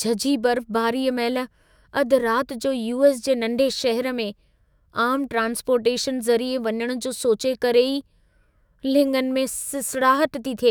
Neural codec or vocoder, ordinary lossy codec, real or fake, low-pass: autoencoder, 48 kHz, 128 numbers a frame, DAC-VAE, trained on Japanese speech; none; fake; none